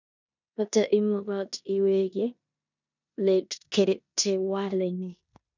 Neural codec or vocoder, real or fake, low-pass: codec, 16 kHz in and 24 kHz out, 0.9 kbps, LongCat-Audio-Codec, four codebook decoder; fake; 7.2 kHz